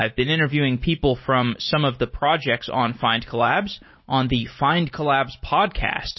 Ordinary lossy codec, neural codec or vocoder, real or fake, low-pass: MP3, 24 kbps; none; real; 7.2 kHz